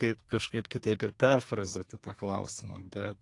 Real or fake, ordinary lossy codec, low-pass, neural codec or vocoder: fake; AAC, 48 kbps; 10.8 kHz; codec, 24 kHz, 1 kbps, SNAC